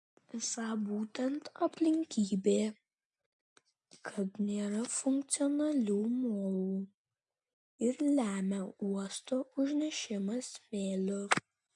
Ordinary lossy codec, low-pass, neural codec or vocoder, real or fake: MP3, 64 kbps; 10.8 kHz; none; real